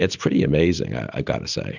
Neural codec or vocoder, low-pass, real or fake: none; 7.2 kHz; real